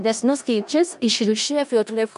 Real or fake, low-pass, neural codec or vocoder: fake; 10.8 kHz; codec, 16 kHz in and 24 kHz out, 0.4 kbps, LongCat-Audio-Codec, four codebook decoder